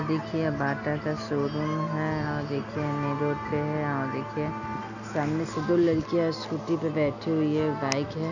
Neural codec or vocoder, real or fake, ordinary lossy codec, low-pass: none; real; none; 7.2 kHz